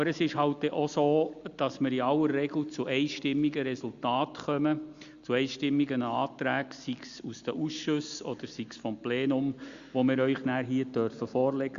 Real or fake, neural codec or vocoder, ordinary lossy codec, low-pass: real; none; Opus, 64 kbps; 7.2 kHz